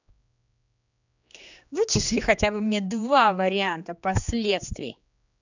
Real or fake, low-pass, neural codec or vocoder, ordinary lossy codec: fake; 7.2 kHz; codec, 16 kHz, 2 kbps, X-Codec, HuBERT features, trained on general audio; none